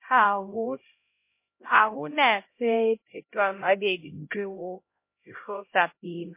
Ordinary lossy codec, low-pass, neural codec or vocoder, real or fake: MP3, 24 kbps; 3.6 kHz; codec, 16 kHz, 0.5 kbps, X-Codec, HuBERT features, trained on LibriSpeech; fake